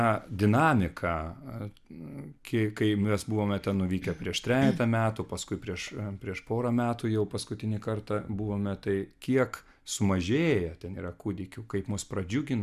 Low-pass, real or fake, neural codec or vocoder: 14.4 kHz; real; none